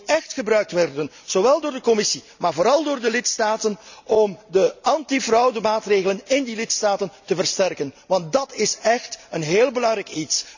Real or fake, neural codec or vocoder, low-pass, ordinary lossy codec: real; none; 7.2 kHz; none